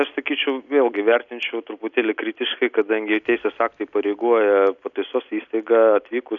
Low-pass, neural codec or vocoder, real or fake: 7.2 kHz; none; real